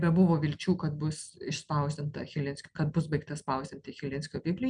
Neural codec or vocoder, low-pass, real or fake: none; 9.9 kHz; real